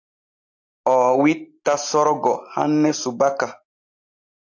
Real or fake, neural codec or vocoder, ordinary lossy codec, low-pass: real; none; AAC, 48 kbps; 7.2 kHz